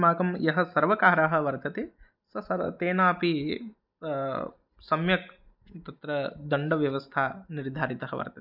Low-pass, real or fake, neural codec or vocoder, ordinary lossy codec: 5.4 kHz; real; none; none